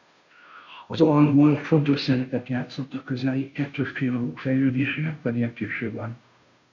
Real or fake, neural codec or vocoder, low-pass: fake; codec, 16 kHz, 0.5 kbps, FunCodec, trained on Chinese and English, 25 frames a second; 7.2 kHz